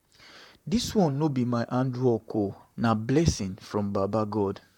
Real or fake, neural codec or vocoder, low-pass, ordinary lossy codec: fake; vocoder, 44.1 kHz, 128 mel bands, Pupu-Vocoder; 19.8 kHz; MP3, 96 kbps